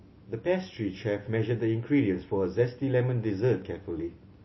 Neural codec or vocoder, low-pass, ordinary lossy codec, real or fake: none; 7.2 kHz; MP3, 24 kbps; real